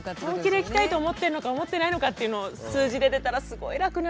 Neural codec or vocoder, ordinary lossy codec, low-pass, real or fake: none; none; none; real